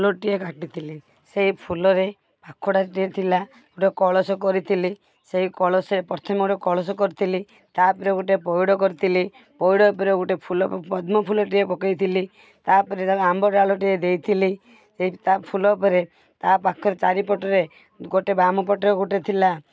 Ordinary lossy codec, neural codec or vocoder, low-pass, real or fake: none; none; none; real